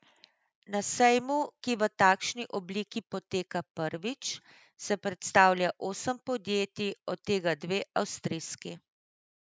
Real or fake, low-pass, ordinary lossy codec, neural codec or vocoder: real; none; none; none